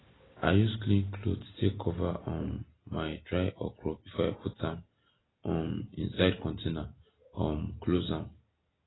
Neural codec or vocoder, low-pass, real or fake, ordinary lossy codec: none; 7.2 kHz; real; AAC, 16 kbps